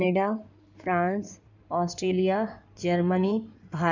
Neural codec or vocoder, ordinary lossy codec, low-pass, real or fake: codec, 16 kHz in and 24 kHz out, 2.2 kbps, FireRedTTS-2 codec; none; 7.2 kHz; fake